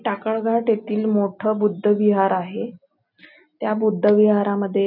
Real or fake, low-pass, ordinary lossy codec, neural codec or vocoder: real; 5.4 kHz; none; none